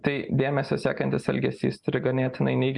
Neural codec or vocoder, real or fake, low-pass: none; real; 10.8 kHz